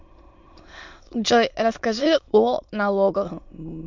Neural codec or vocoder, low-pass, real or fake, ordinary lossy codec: autoencoder, 22.05 kHz, a latent of 192 numbers a frame, VITS, trained on many speakers; 7.2 kHz; fake; MP3, 64 kbps